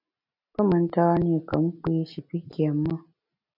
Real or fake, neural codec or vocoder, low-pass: real; none; 5.4 kHz